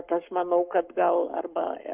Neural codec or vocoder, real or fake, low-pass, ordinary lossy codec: codec, 44.1 kHz, 7.8 kbps, Pupu-Codec; fake; 3.6 kHz; Opus, 24 kbps